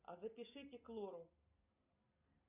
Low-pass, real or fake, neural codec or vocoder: 3.6 kHz; real; none